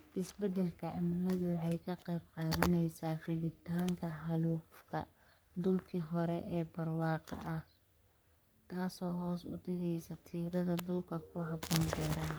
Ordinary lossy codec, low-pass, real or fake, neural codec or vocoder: none; none; fake; codec, 44.1 kHz, 3.4 kbps, Pupu-Codec